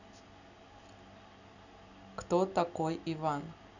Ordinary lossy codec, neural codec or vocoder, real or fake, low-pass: none; none; real; 7.2 kHz